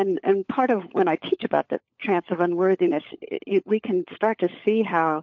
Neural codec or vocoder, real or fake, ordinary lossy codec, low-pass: codec, 16 kHz, 16 kbps, FunCodec, trained on LibriTTS, 50 frames a second; fake; MP3, 48 kbps; 7.2 kHz